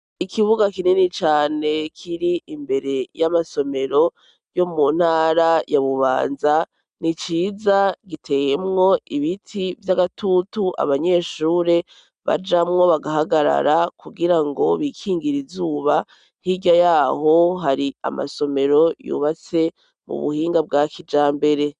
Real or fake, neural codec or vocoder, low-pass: real; none; 9.9 kHz